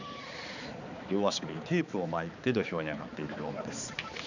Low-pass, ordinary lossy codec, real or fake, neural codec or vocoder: 7.2 kHz; none; fake; codec, 16 kHz, 4 kbps, X-Codec, HuBERT features, trained on balanced general audio